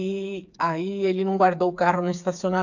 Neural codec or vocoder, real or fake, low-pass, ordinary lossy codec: codec, 16 kHz, 4 kbps, FreqCodec, smaller model; fake; 7.2 kHz; none